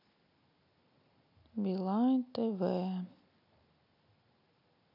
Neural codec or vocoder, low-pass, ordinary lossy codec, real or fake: none; 5.4 kHz; none; real